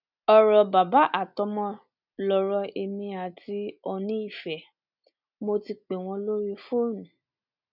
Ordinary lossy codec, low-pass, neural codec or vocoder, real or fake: none; 5.4 kHz; none; real